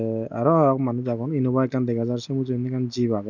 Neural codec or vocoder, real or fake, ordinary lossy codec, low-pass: none; real; none; 7.2 kHz